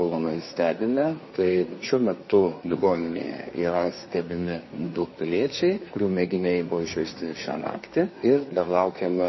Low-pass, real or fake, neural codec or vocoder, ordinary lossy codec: 7.2 kHz; fake; codec, 24 kHz, 1 kbps, SNAC; MP3, 24 kbps